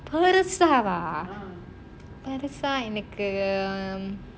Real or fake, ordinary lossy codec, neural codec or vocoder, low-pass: real; none; none; none